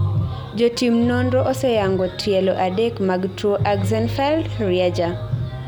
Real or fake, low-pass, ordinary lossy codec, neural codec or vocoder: real; 19.8 kHz; none; none